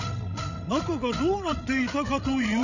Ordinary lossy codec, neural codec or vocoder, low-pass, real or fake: none; codec, 16 kHz, 16 kbps, FreqCodec, larger model; 7.2 kHz; fake